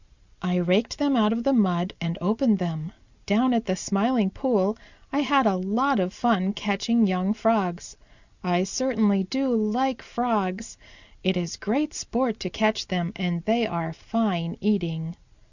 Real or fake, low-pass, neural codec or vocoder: real; 7.2 kHz; none